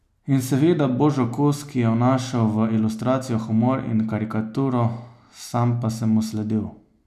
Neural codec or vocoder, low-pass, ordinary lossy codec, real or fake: none; 14.4 kHz; none; real